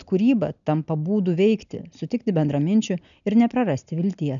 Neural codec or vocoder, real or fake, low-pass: none; real; 7.2 kHz